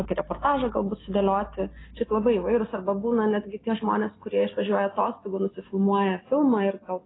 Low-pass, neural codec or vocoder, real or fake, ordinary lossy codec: 7.2 kHz; none; real; AAC, 16 kbps